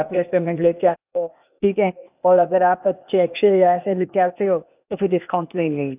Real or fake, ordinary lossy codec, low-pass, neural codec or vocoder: fake; none; 3.6 kHz; codec, 16 kHz, 0.8 kbps, ZipCodec